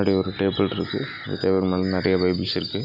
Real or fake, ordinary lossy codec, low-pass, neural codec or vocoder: real; none; 5.4 kHz; none